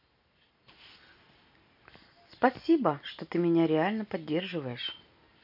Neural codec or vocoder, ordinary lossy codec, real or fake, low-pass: none; none; real; 5.4 kHz